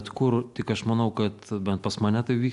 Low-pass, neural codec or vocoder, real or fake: 10.8 kHz; none; real